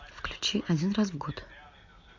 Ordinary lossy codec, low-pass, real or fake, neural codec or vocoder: MP3, 64 kbps; 7.2 kHz; real; none